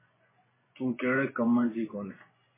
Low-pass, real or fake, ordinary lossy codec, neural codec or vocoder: 3.6 kHz; fake; MP3, 16 kbps; vocoder, 24 kHz, 100 mel bands, Vocos